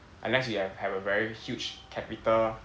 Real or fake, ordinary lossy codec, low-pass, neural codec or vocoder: real; none; none; none